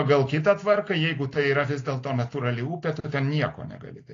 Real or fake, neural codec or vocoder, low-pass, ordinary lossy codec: real; none; 7.2 kHz; AAC, 32 kbps